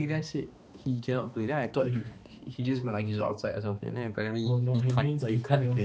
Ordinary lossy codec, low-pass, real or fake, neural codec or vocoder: none; none; fake; codec, 16 kHz, 2 kbps, X-Codec, HuBERT features, trained on balanced general audio